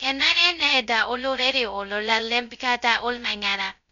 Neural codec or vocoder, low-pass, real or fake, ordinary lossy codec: codec, 16 kHz, 0.2 kbps, FocalCodec; 7.2 kHz; fake; none